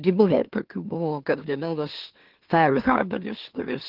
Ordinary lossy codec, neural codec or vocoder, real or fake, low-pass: Opus, 16 kbps; autoencoder, 44.1 kHz, a latent of 192 numbers a frame, MeloTTS; fake; 5.4 kHz